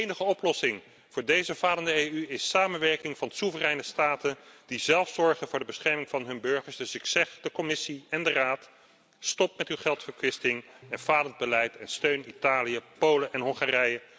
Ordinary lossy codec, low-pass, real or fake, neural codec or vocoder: none; none; real; none